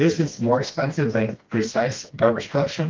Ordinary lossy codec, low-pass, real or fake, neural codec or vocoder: Opus, 32 kbps; 7.2 kHz; fake; codec, 16 kHz, 1 kbps, FreqCodec, smaller model